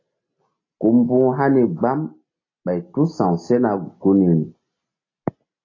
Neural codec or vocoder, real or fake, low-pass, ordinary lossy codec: none; real; 7.2 kHz; AAC, 32 kbps